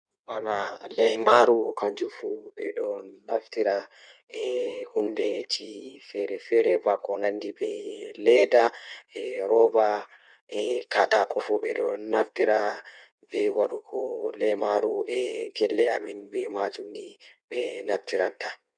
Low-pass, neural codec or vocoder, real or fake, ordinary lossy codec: 9.9 kHz; codec, 16 kHz in and 24 kHz out, 1.1 kbps, FireRedTTS-2 codec; fake; none